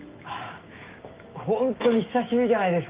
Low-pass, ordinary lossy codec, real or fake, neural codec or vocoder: 3.6 kHz; Opus, 32 kbps; fake; autoencoder, 48 kHz, 128 numbers a frame, DAC-VAE, trained on Japanese speech